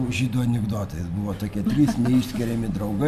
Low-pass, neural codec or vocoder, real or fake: 14.4 kHz; none; real